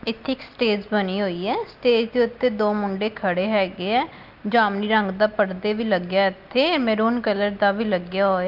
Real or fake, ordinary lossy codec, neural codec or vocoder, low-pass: real; Opus, 24 kbps; none; 5.4 kHz